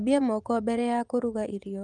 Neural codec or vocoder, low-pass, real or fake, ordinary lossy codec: vocoder, 24 kHz, 100 mel bands, Vocos; 10.8 kHz; fake; Opus, 24 kbps